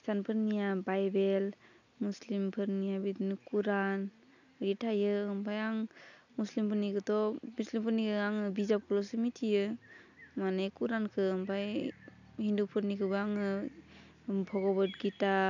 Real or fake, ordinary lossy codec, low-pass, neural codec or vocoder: real; none; 7.2 kHz; none